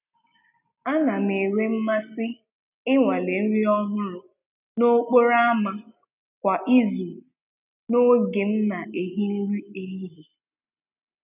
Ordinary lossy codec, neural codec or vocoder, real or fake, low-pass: none; none; real; 3.6 kHz